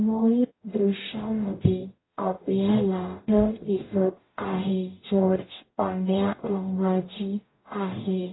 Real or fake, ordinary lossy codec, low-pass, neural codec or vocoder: fake; AAC, 16 kbps; 7.2 kHz; codec, 44.1 kHz, 0.9 kbps, DAC